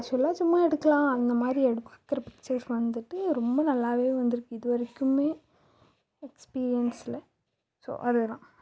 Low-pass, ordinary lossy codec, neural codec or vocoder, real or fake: none; none; none; real